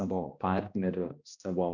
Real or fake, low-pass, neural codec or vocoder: fake; 7.2 kHz; codec, 16 kHz, 1 kbps, X-Codec, HuBERT features, trained on balanced general audio